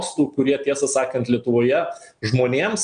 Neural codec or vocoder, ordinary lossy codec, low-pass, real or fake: none; MP3, 96 kbps; 9.9 kHz; real